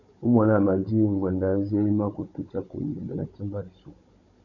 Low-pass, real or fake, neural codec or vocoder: 7.2 kHz; fake; codec, 16 kHz, 16 kbps, FunCodec, trained on Chinese and English, 50 frames a second